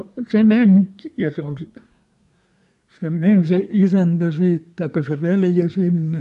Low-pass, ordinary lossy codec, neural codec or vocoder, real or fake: 10.8 kHz; none; codec, 24 kHz, 1 kbps, SNAC; fake